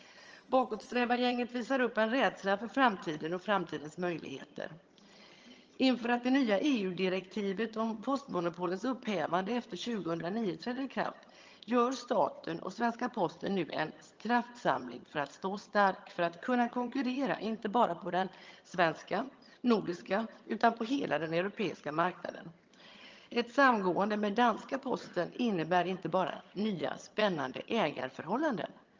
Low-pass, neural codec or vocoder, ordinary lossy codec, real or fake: 7.2 kHz; vocoder, 22.05 kHz, 80 mel bands, HiFi-GAN; Opus, 24 kbps; fake